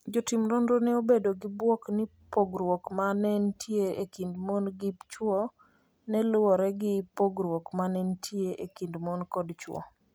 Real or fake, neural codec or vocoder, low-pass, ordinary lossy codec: real; none; none; none